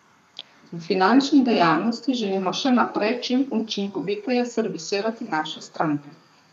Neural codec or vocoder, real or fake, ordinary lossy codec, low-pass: codec, 32 kHz, 1.9 kbps, SNAC; fake; none; 14.4 kHz